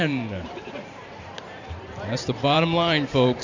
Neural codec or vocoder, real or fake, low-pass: vocoder, 44.1 kHz, 128 mel bands every 512 samples, BigVGAN v2; fake; 7.2 kHz